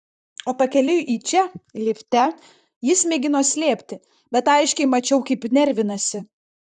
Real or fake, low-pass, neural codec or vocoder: fake; 10.8 kHz; vocoder, 44.1 kHz, 128 mel bands, Pupu-Vocoder